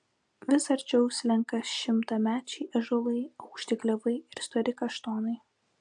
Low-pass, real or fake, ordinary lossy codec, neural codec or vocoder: 9.9 kHz; real; AAC, 64 kbps; none